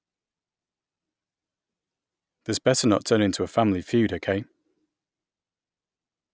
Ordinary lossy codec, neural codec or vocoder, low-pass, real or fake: none; none; none; real